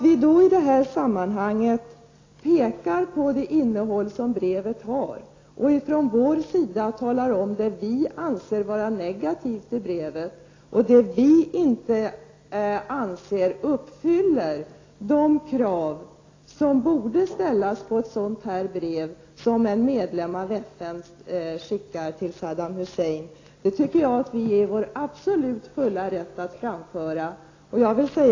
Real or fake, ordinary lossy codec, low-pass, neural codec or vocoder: real; AAC, 32 kbps; 7.2 kHz; none